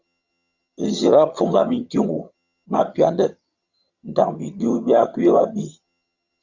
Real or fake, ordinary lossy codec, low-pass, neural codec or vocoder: fake; Opus, 64 kbps; 7.2 kHz; vocoder, 22.05 kHz, 80 mel bands, HiFi-GAN